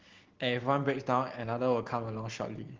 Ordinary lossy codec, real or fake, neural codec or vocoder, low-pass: Opus, 16 kbps; real; none; 7.2 kHz